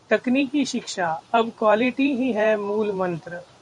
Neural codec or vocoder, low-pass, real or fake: vocoder, 44.1 kHz, 128 mel bands every 512 samples, BigVGAN v2; 10.8 kHz; fake